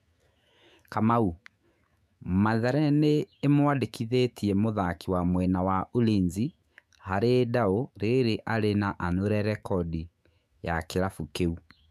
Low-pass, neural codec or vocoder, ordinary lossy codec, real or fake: 14.4 kHz; autoencoder, 48 kHz, 128 numbers a frame, DAC-VAE, trained on Japanese speech; MP3, 96 kbps; fake